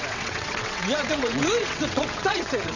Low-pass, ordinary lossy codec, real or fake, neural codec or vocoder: 7.2 kHz; none; fake; vocoder, 22.05 kHz, 80 mel bands, WaveNeXt